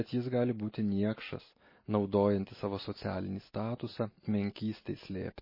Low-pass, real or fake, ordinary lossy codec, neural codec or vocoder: 5.4 kHz; real; MP3, 24 kbps; none